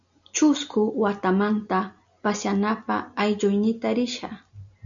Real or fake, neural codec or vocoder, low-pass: real; none; 7.2 kHz